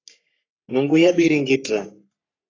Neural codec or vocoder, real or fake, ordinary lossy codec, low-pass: codec, 44.1 kHz, 3.4 kbps, Pupu-Codec; fake; AAC, 32 kbps; 7.2 kHz